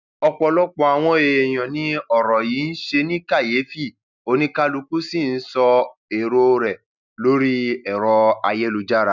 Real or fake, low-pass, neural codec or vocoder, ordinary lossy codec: real; 7.2 kHz; none; none